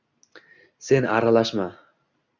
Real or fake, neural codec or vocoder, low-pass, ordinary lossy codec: real; none; 7.2 kHz; Opus, 64 kbps